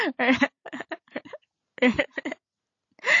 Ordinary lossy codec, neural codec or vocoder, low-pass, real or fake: AAC, 48 kbps; none; 7.2 kHz; real